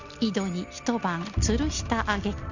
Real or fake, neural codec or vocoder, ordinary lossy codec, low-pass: real; none; Opus, 64 kbps; 7.2 kHz